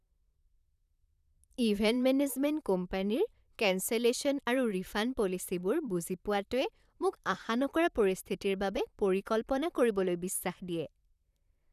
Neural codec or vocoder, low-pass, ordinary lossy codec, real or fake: vocoder, 44.1 kHz, 128 mel bands, Pupu-Vocoder; 14.4 kHz; none; fake